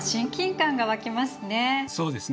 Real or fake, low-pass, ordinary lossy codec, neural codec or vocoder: real; none; none; none